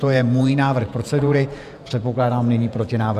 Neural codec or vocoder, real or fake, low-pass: vocoder, 48 kHz, 128 mel bands, Vocos; fake; 14.4 kHz